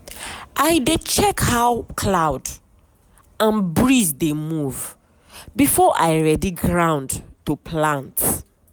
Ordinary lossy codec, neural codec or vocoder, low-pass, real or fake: none; none; none; real